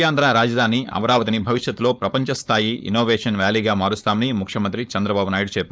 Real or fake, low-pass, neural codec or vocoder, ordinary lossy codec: fake; none; codec, 16 kHz, 4.8 kbps, FACodec; none